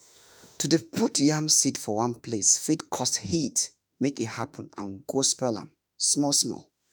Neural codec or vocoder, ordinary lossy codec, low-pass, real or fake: autoencoder, 48 kHz, 32 numbers a frame, DAC-VAE, trained on Japanese speech; none; none; fake